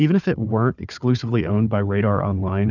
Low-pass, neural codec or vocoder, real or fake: 7.2 kHz; codec, 16 kHz, 4 kbps, FunCodec, trained on Chinese and English, 50 frames a second; fake